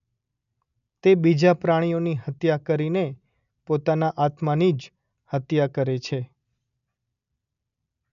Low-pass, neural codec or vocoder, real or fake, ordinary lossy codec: 7.2 kHz; none; real; none